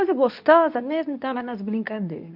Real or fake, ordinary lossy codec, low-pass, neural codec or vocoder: fake; MP3, 48 kbps; 5.4 kHz; codec, 24 kHz, 0.9 kbps, WavTokenizer, medium speech release version 2